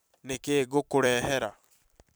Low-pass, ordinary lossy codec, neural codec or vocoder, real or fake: none; none; vocoder, 44.1 kHz, 128 mel bands every 256 samples, BigVGAN v2; fake